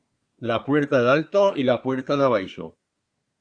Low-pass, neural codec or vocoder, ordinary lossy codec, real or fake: 9.9 kHz; codec, 24 kHz, 1 kbps, SNAC; Opus, 64 kbps; fake